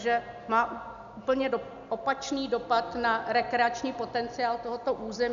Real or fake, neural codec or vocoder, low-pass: real; none; 7.2 kHz